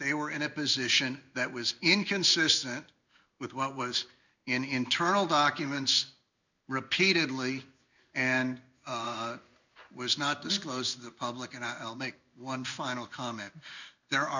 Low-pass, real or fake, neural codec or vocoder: 7.2 kHz; fake; codec, 16 kHz in and 24 kHz out, 1 kbps, XY-Tokenizer